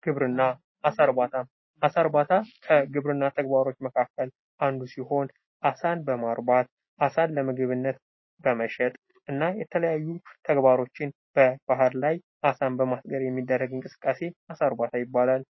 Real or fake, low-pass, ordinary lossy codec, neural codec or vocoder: real; 7.2 kHz; MP3, 24 kbps; none